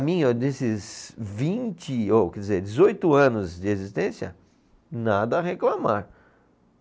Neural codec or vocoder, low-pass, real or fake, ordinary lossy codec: none; none; real; none